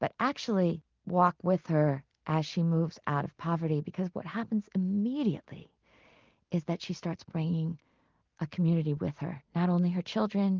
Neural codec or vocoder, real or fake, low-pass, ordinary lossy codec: none; real; 7.2 kHz; Opus, 32 kbps